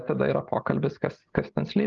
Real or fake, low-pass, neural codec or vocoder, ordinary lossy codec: real; 7.2 kHz; none; Opus, 32 kbps